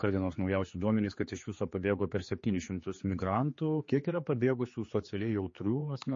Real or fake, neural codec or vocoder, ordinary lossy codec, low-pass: fake; codec, 16 kHz, 4 kbps, X-Codec, HuBERT features, trained on general audio; MP3, 32 kbps; 7.2 kHz